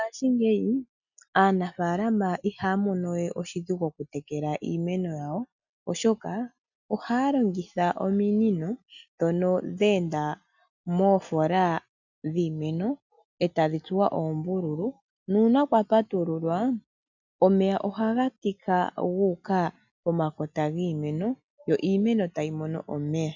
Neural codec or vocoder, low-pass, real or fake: none; 7.2 kHz; real